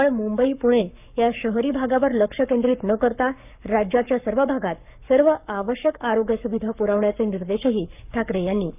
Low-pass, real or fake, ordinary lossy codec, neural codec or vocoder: 3.6 kHz; fake; none; codec, 44.1 kHz, 7.8 kbps, Pupu-Codec